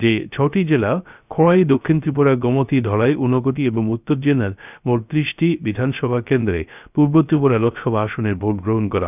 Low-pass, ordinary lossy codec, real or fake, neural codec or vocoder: 3.6 kHz; none; fake; codec, 16 kHz, 0.3 kbps, FocalCodec